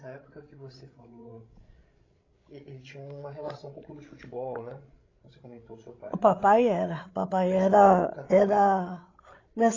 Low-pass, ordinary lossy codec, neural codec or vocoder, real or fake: 7.2 kHz; AAC, 32 kbps; codec, 16 kHz, 16 kbps, FreqCodec, larger model; fake